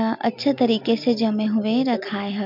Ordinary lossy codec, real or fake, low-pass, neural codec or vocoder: MP3, 48 kbps; real; 5.4 kHz; none